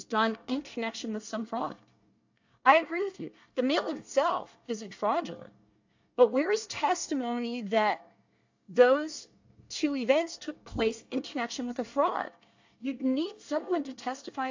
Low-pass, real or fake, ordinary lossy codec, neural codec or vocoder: 7.2 kHz; fake; AAC, 48 kbps; codec, 24 kHz, 1 kbps, SNAC